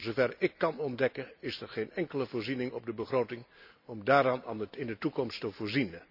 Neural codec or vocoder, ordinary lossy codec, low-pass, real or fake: none; none; 5.4 kHz; real